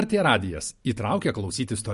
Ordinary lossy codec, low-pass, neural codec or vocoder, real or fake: MP3, 48 kbps; 14.4 kHz; none; real